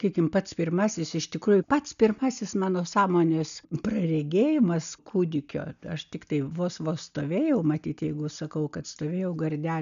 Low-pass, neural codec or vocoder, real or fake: 7.2 kHz; none; real